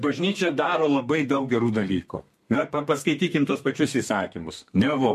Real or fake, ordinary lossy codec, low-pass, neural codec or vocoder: fake; MP3, 64 kbps; 14.4 kHz; codec, 32 kHz, 1.9 kbps, SNAC